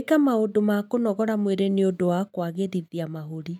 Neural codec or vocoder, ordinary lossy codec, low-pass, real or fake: none; none; 19.8 kHz; real